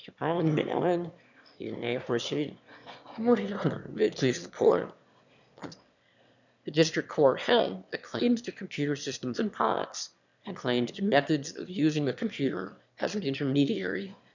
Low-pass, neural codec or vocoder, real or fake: 7.2 kHz; autoencoder, 22.05 kHz, a latent of 192 numbers a frame, VITS, trained on one speaker; fake